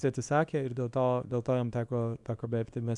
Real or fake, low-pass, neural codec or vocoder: fake; 10.8 kHz; codec, 24 kHz, 0.9 kbps, WavTokenizer, small release